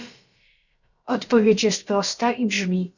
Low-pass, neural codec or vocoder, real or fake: 7.2 kHz; codec, 16 kHz, about 1 kbps, DyCAST, with the encoder's durations; fake